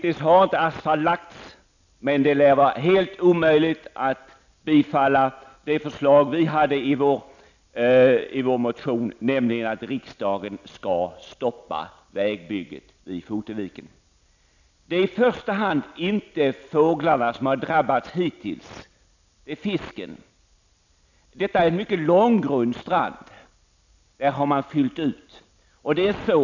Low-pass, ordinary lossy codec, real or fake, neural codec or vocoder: 7.2 kHz; none; real; none